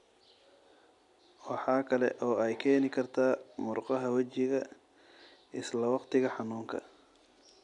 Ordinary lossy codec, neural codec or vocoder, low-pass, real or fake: none; none; 10.8 kHz; real